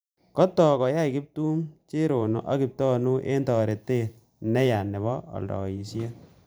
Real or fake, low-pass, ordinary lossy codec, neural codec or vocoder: fake; none; none; vocoder, 44.1 kHz, 128 mel bands every 512 samples, BigVGAN v2